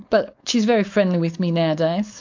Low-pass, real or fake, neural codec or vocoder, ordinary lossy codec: 7.2 kHz; fake; codec, 16 kHz, 4.8 kbps, FACodec; MP3, 64 kbps